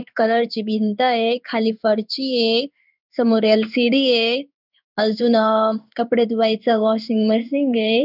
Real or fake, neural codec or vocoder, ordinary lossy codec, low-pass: fake; codec, 16 kHz in and 24 kHz out, 1 kbps, XY-Tokenizer; none; 5.4 kHz